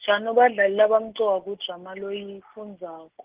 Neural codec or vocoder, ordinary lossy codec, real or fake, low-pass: none; Opus, 16 kbps; real; 3.6 kHz